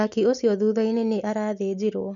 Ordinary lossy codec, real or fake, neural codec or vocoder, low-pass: none; real; none; 7.2 kHz